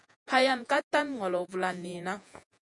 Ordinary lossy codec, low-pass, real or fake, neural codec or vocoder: MP3, 64 kbps; 10.8 kHz; fake; vocoder, 48 kHz, 128 mel bands, Vocos